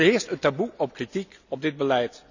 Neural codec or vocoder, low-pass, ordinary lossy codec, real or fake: none; 7.2 kHz; none; real